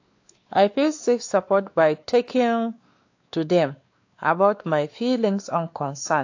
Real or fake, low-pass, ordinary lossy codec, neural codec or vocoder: fake; 7.2 kHz; AAC, 48 kbps; codec, 16 kHz, 2 kbps, X-Codec, WavLM features, trained on Multilingual LibriSpeech